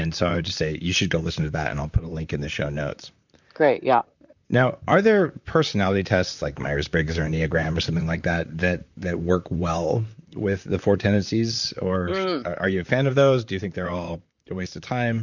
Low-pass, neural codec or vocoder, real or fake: 7.2 kHz; vocoder, 44.1 kHz, 128 mel bands, Pupu-Vocoder; fake